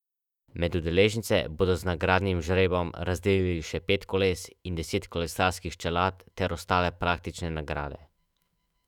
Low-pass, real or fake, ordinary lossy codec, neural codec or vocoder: 19.8 kHz; fake; none; autoencoder, 48 kHz, 128 numbers a frame, DAC-VAE, trained on Japanese speech